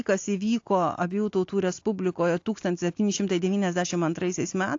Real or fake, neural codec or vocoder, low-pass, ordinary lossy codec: real; none; 7.2 kHz; AAC, 48 kbps